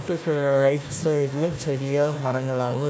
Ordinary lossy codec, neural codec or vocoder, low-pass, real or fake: none; codec, 16 kHz, 1 kbps, FunCodec, trained on Chinese and English, 50 frames a second; none; fake